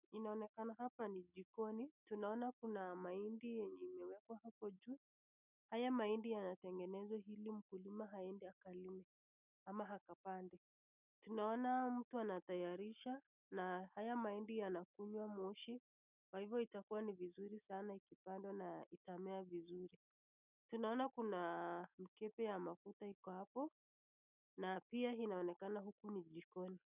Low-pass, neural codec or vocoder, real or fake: 3.6 kHz; none; real